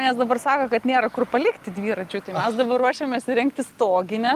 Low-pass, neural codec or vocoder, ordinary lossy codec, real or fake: 14.4 kHz; none; Opus, 32 kbps; real